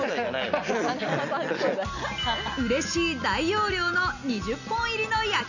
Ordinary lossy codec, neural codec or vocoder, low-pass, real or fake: none; none; 7.2 kHz; real